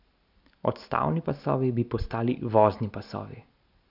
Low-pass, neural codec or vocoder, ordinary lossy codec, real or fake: 5.4 kHz; none; none; real